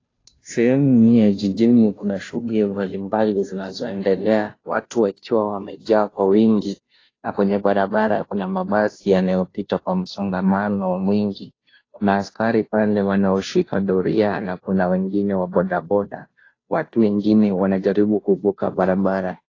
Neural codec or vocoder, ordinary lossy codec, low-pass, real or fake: codec, 16 kHz, 0.5 kbps, FunCodec, trained on Chinese and English, 25 frames a second; AAC, 32 kbps; 7.2 kHz; fake